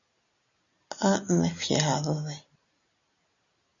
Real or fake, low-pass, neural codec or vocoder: real; 7.2 kHz; none